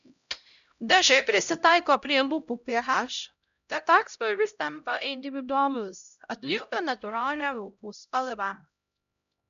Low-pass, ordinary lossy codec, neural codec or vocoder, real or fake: 7.2 kHz; MP3, 96 kbps; codec, 16 kHz, 0.5 kbps, X-Codec, HuBERT features, trained on LibriSpeech; fake